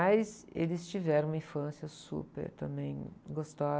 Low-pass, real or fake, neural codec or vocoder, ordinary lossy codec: none; real; none; none